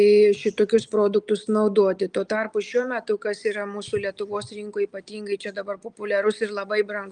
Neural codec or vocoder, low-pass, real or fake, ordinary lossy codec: none; 9.9 kHz; real; Opus, 32 kbps